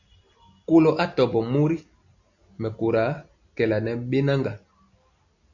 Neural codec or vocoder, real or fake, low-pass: none; real; 7.2 kHz